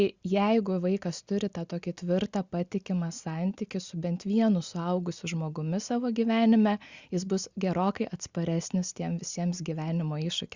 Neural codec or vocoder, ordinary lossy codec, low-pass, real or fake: none; Opus, 64 kbps; 7.2 kHz; real